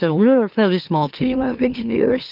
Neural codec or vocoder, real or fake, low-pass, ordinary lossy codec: autoencoder, 44.1 kHz, a latent of 192 numbers a frame, MeloTTS; fake; 5.4 kHz; Opus, 24 kbps